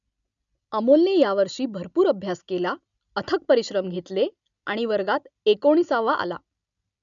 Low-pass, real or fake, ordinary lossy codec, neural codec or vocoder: 7.2 kHz; real; none; none